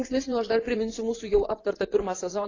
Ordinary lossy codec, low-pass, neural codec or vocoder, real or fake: AAC, 32 kbps; 7.2 kHz; vocoder, 24 kHz, 100 mel bands, Vocos; fake